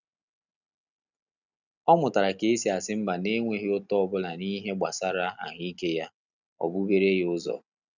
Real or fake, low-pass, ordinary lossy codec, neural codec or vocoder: real; 7.2 kHz; none; none